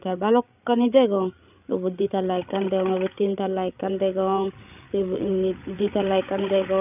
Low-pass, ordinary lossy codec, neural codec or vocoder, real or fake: 3.6 kHz; none; codec, 16 kHz, 8 kbps, FreqCodec, larger model; fake